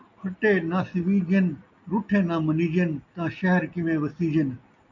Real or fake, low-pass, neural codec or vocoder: real; 7.2 kHz; none